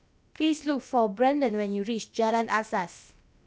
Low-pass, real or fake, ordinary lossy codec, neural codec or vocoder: none; fake; none; codec, 16 kHz, about 1 kbps, DyCAST, with the encoder's durations